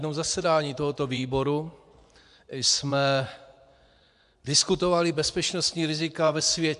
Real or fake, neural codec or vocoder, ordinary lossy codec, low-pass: fake; vocoder, 24 kHz, 100 mel bands, Vocos; AAC, 96 kbps; 10.8 kHz